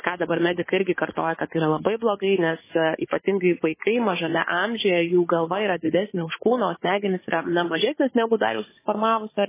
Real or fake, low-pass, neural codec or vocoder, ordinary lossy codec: fake; 3.6 kHz; codec, 16 kHz, 4 kbps, FunCodec, trained on Chinese and English, 50 frames a second; MP3, 16 kbps